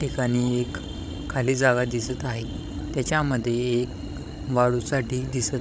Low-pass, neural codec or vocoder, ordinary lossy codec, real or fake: none; codec, 16 kHz, 16 kbps, FreqCodec, larger model; none; fake